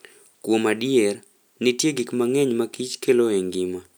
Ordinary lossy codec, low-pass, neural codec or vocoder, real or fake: none; none; none; real